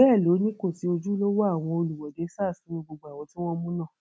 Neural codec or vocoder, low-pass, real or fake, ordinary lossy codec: none; none; real; none